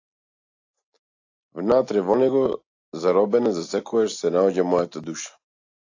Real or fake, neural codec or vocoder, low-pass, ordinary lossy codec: real; none; 7.2 kHz; AAC, 48 kbps